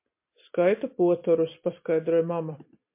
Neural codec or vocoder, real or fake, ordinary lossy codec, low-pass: none; real; MP3, 24 kbps; 3.6 kHz